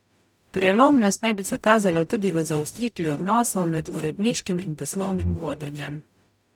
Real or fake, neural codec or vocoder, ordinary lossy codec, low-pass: fake; codec, 44.1 kHz, 0.9 kbps, DAC; none; 19.8 kHz